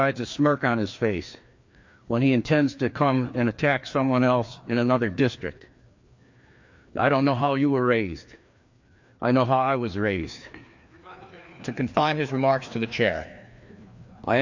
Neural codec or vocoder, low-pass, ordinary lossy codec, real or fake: codec, 16 kHz, 2 kbps, FreqCodec, larger model; 7.2 kHz; MP3, 48 kbps; fake